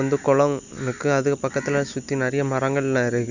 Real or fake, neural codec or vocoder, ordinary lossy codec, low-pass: real; none; none; 7.2 kHz